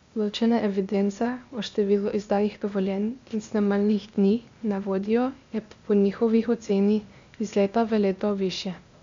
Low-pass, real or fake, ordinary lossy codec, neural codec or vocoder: 7.2 kHz; fake; MP3, 64 kbps; codec, 16 kHz, 0.8 kbps, ZipCodec